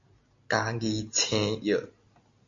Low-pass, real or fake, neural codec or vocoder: 7.2 kHz; real; none